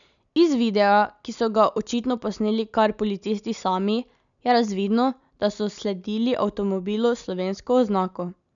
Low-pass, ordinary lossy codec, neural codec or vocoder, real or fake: 7.2 kHz; none; none; real